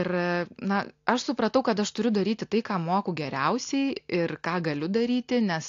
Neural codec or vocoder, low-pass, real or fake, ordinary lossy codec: none; 7.2 kHz; real; AAC, 48 kbps